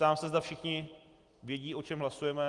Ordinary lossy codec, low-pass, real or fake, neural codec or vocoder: Opus, 24 kbps; 10.8 kHz; real; none